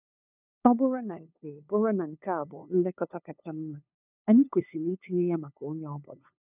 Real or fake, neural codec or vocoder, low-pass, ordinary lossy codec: fake; codec, 16 kHz, 2 kbps, FunCodec, trained on LibriTTS, 25 frames a second; 3.6 kHz; none